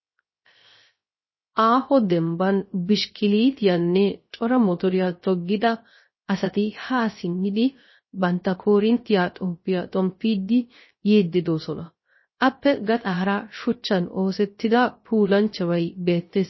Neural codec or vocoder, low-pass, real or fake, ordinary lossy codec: codec, 16 kHz, 0.3 kbps, FocalCodec; 7.2 kHz; fake; MP3, 24 kbps